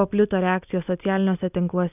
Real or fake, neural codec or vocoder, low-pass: real; none; 3.6 kHz